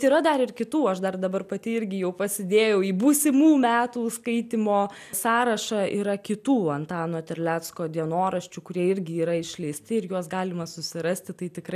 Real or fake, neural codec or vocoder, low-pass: real; none; 14.4 kHz